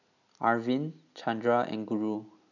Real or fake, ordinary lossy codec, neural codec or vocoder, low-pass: real; none; none; 7.2 kHz